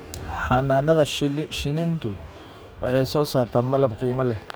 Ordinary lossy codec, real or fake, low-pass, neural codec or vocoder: none; fake; none; codec, 44.1 kHz, 2.6 kbps, DAC